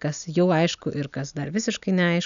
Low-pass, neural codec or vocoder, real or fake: 7.2 kHz; none; real